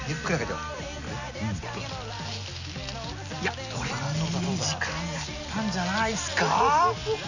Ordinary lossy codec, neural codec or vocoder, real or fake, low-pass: none; none; real; 7.2 kHz